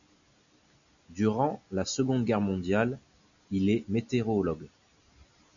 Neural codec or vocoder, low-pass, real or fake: none; 7.2 kHz; real